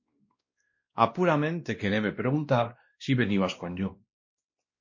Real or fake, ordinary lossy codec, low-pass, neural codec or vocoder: fake; MP3, 32 kbps; 7.2 kHz; codec, 16 kHz, 1 kbps, X-Codec, WavLM features, trained on Multilingual LibriSpeech